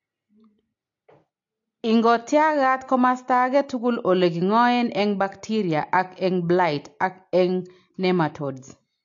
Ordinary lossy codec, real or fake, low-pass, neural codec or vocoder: AAC, 48 kbps; real; 7.2 kHz; none